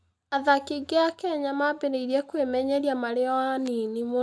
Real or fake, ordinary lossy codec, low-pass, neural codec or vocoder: real; none; none; none